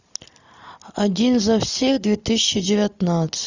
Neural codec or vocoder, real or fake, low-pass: none; real; 7.2 kHz